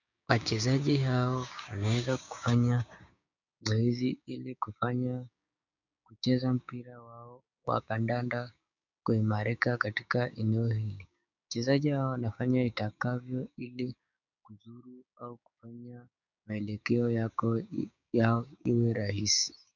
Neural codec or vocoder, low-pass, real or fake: codec, 16 kHz, 6 kbps, DAC; 7.2 kHz; fake